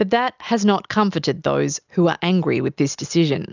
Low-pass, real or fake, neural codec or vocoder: 7.2 kHz; real; none